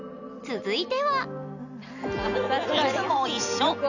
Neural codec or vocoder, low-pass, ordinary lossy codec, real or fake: none; 7.2 kHz; none; real